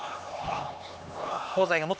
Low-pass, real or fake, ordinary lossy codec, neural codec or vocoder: none; fake; none; codec, 16 kHz, 2 kbps, X-Codec, HuBERT features, trained on LibriSpeech